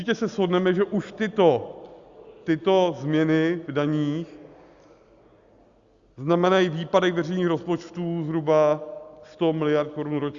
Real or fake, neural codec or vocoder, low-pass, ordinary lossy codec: real; none; 7.2 kHz; Opus, 64 kbps